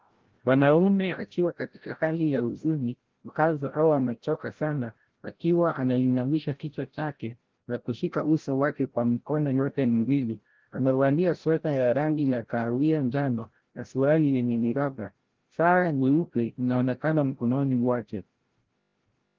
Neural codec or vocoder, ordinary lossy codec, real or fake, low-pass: codec, 16 kHz, 0.5 kbps, FreqCodec, larger model; Opus, 16 kbps; fake; 7.2 kHz